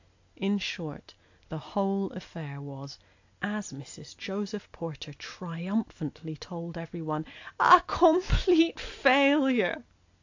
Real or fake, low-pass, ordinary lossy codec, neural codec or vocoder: real; 7.2 kHz; AAC, 48 kbps; none